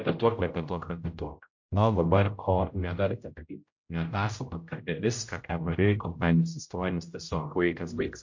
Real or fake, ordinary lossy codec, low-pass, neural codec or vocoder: fake; MP3, 48 kbps; 7.2 kHz; codec, 16 kHz, 0.5 kbps, X-Codec, HuBERT features, trained on general audio